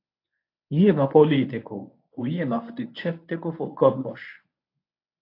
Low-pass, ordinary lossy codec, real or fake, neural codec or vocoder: 5.4 kHz; AAC, 32 kbps; fake; codec, 24 kHz, 0.9 kbps, WavTokenizer, medium speech release version 1